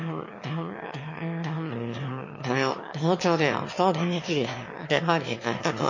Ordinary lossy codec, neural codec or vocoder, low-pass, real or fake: MP3, 32 kbps; autoencoder, 22.05 kHz, a latent of 192 numbers a frame, VITS, trained on one speaker; 7.2 kHz; fake